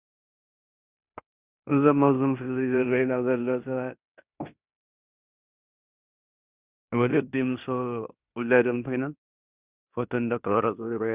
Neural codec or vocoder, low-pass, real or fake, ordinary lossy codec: codec, 16 kHz in and 24 kHz out, 0.9 kbps, LongCat-Audio-Codec, fine tuned four codebook decoder; 3.6 kHz; fake; Opus, 64 kbps